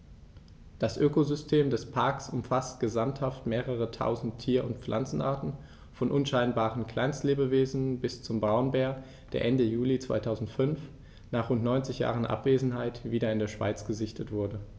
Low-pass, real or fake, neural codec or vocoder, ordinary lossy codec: none; real; none; none